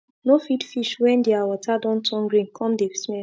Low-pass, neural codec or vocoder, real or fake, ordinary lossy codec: none; none; real; none